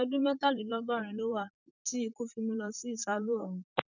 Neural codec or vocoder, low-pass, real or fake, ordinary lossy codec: vocoder, 44.1 kHz, 128 mel bands, Pupu-Vocoder; 7.2 kHz; fake; none